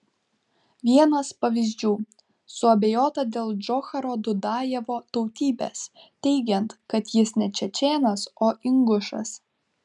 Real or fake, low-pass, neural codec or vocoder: real; 10.8 kHz; none